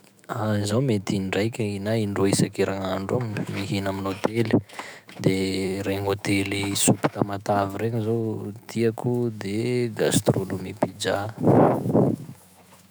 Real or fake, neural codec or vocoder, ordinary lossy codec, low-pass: fake; autoencoder, 48 kHz, 128 numbers a frame, DAC-VAE, trained on Japanese speech; none; none